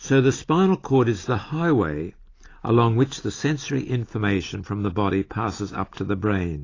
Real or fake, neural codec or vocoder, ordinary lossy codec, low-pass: real; none; AAC, 32 kbps; 7.2 kHz